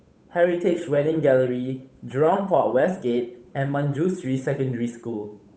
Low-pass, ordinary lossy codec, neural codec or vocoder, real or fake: none; none; codec, 16 kHz, 8 kbps, FunCodec, trained on Chinese and English, 25 frames a second; fake